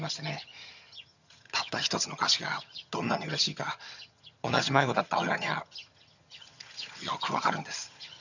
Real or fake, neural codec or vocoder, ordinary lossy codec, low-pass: fake; vocoder, 22.05 kHz, 80 mel bands, HiFi-GAN; none; 7.2 kHz